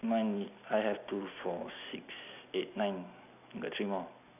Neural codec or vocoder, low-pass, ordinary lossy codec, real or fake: none; 3.6 kHz; none; real